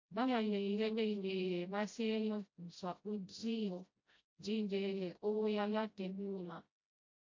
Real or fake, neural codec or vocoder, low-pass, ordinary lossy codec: fake; codec, 16 kHz, 0.5 kbps, FreqCodec, smaller model; 7.2 kHz; MP3, 48 kbps